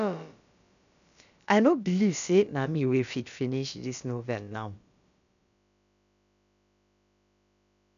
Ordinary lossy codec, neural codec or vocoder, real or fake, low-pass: none; codec, 16 kHz, about 1 kbps, DyCAST, with the encoder's durations; fake; 7.2 kHz